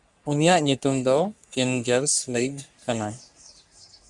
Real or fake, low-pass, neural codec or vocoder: fake; 10.8 kHz; codec, 44.1 kHz, 3.4 kbps, Pupu-Codec